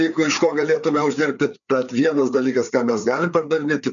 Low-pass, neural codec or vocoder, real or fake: 7.2 kHz; codec, 16 kHz, 4 kbps, FreqCodec, larger model; fake